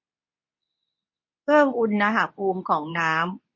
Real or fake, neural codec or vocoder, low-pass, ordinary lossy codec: fake; codec, 24 kHz, 0.9 kbps, WavTokenizer, medium speech release version 2; 7.2 kHz; MP3, 32 kbps